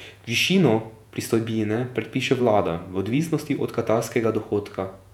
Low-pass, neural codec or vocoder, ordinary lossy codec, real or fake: 19.8 kHz; vocoder, 48 kHz, 128 mel bands, Vocos; none; fake